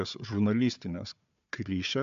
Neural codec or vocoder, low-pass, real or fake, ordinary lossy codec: codec, 16 kHz, 4 kbps, FunCodec, trained on Chinese and English, 50 frames a second; 7.2 kHz; fake; MP3, 64 kbps